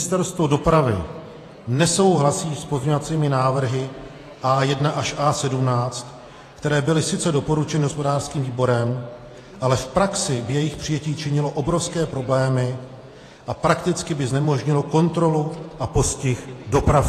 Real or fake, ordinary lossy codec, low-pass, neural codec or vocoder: fake; AAC, 48 kbps; 14.4 kHz; vocoder, 48 kHz, 128 mel bands, Vocos